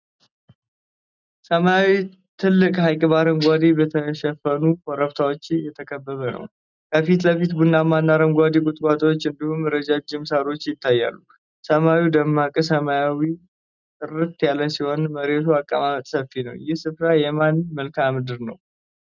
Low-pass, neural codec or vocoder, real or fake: 7.2 kHz; none; real